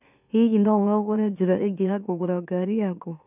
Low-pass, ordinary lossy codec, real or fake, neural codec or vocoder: 3.6 kHz; none; fake; autoencoder, 44.1 kHz, a latent of 192 numbers a frame, MeloTTS